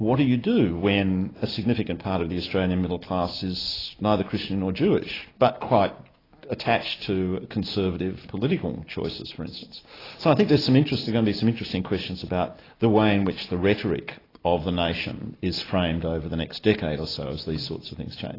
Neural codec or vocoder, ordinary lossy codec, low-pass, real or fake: none; AAC, 24 kbps; 5.4 kHz; real